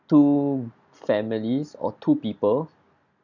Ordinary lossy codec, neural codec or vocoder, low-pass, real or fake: none; none; 7.2 kHz; real